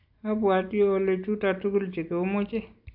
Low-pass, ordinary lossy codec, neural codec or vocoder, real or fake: 5.4 kHz; AAC, 48 kbps; none; real